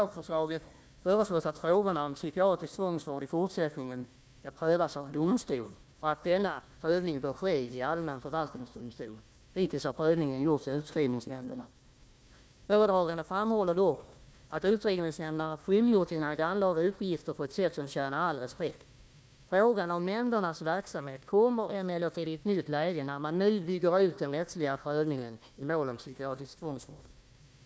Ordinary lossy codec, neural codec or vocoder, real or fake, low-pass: none; codec, 16 kHz, 1 kbps, FunCodec, trained on Chinese and English, 50 frames a second; fake; none